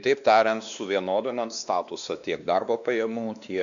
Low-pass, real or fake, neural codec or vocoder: 7.2 kHz; fake; codec, 16 kHz, 2 kbps, X-Codec, WavLM features, trained on Multilingual LibriSpeech